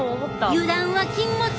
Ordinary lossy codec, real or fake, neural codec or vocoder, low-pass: none; real; none; none